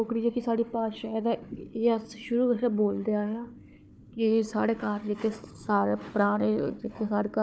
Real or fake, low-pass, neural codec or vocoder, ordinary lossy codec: fake; none; codec, 16 kHz, 4 kbps, FunCodec, trained on Chinese and English, 50 frames a second; none